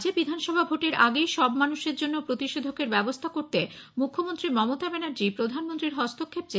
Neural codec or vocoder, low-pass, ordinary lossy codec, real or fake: none; none; none; real